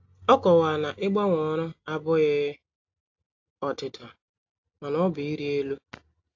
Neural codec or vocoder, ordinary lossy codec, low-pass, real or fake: none; AAC, 48 kbps; 7.2 kHz; real